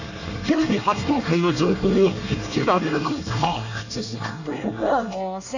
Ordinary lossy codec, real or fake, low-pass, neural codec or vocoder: none; fake; 7.2 kHz; codec, 24 kHz, 1 kbps, SNAC